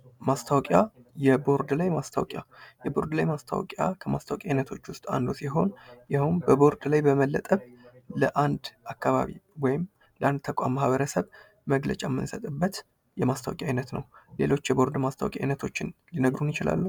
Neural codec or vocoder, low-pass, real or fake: none; 19.8 kHz; real